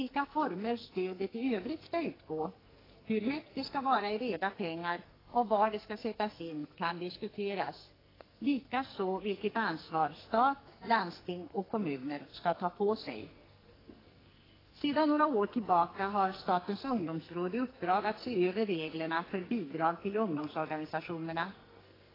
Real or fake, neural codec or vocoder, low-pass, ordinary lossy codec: fake; codec, 44.1 kHz, 2.6 kbps, SNAC; 5.4 kHz; AAC, 24 kbps